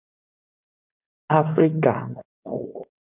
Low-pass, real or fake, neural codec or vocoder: 3.6 kHz; fake; codec, 16 kHz, 4.8 kbps, FACodec